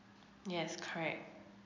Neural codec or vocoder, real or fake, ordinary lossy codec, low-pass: none; real; MP3, 64 kbps; 7.2 kHz